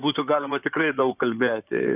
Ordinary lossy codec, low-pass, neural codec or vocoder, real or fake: AAC, 32 kbps; 3.6 kHz; codec, 16 kHz in and 24 kHz out, 2.2 kbps, FireRedTTS-2 codec; fake